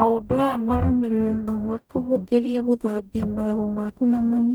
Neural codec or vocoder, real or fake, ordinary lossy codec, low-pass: codec, 44.1 kHz, 0.9 kbps, DAC; fake; none; none